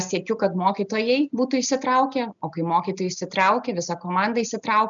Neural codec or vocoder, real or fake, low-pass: none; real; 7.2 kHz